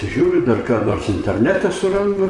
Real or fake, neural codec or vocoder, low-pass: fake; vocoder, 44.1 kHz, 128 mel bands, Pupu-Vocoder; 10.8 kHz